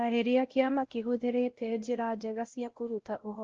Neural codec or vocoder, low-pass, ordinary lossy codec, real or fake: codec, 16 kHz, 0.5 kbps, X-Codec, WavLM features, trained on Multilingual LibriSpeech; 7.2 kHz; Opus, 16 kbps; fake